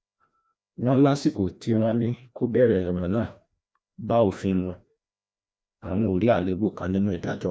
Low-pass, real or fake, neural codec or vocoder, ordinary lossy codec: none; fake; codec, 16 kHz, 1 kbps, FreqCodec, larger model; none